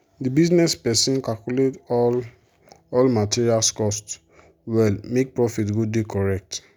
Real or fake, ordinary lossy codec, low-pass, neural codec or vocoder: real; none; none; none